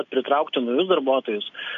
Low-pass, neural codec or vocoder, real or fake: 7.2 kHz; none; real